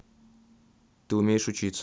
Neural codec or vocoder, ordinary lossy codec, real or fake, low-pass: none; none; real; none